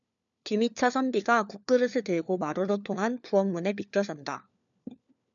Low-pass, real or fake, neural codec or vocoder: 7.2 kHz; fake; codec, 16 kHz, 4 kbps, FunCodec, trained on LibriTTS, 50 frames a second